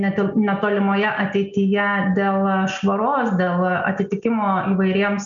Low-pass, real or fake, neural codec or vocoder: 7.2 kHz; real; none